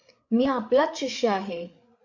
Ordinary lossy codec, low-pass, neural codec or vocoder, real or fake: MP3, 48 kbps; 7.2 kHz; codec, 16 kHz, 8 kbps, FreqCodec, larger model; fake